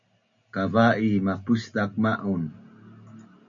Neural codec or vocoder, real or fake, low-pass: none; real; 7.2 kHz